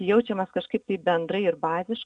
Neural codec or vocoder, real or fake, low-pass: none; real; 9.9 kHz